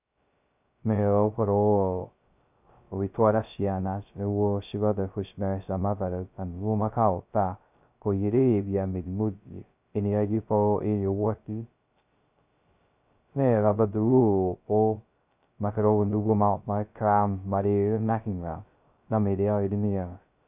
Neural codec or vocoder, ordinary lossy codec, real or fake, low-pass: codec, 16 kHz, 0.2 kbps, FocalCodec; none; fake; 3.6 kHz